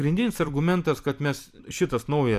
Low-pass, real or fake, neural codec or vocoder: 14.4 kHz; fake; vocoder, 44.1 kHz, 128 mel bands every 256 samples, BigVGAN v2